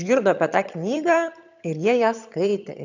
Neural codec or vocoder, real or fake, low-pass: vocoder, 22.05 kHz, 80 mel bands, HiFi-GAN; fake; 7.2 kHz